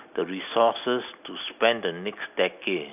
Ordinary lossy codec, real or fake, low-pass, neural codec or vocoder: none; real; 3.6 kHz; none